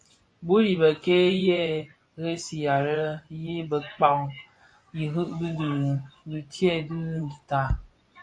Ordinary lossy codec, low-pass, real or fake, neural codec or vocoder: AAC, 48 kbps; 9.9 kHz; fake; vocoder, 24 kHz, 100 mel bands, Vocos